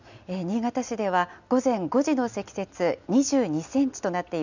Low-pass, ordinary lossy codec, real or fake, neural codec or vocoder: 7.2 kHz; none; real; none